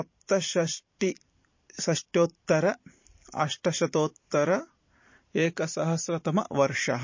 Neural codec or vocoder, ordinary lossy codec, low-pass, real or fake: vocoder, 44.1 kHz, 128 mel bands every 512 samples, BigVGAN v2; MP3, 32 kbps; 7.2 kHz; fake